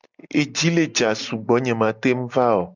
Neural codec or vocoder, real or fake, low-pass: none; real; 7.2 kHz